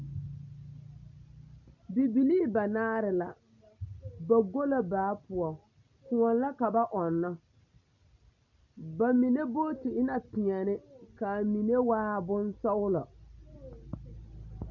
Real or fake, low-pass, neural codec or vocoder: real; 7.2 kHz; none